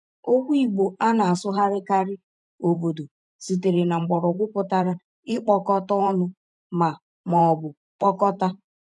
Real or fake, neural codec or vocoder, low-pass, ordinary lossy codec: fake; vocoder, 44.1 kHz, 128 mel bands every 512 samples, BigVGAN v2; 10.8 kHz; none